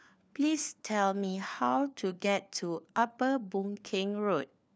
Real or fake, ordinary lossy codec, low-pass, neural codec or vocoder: fake; none; none; codec, 16 kHz, 4 kbps, FunCodec, trained on LibriTTS, 50 frames a second